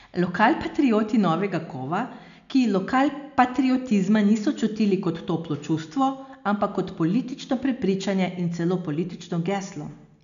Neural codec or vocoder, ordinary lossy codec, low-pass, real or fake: none; none; 7.2 kHz; real